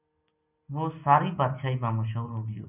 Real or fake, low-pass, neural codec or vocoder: real; 3.6 kHz; none